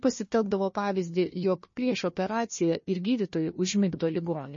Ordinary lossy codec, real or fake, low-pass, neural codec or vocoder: MP3, 32 kbps; fake; 7.2 kHz; codec, 16 kHz, 1 kbps, FunCodec, trained on Chinese and English, 50 frames a second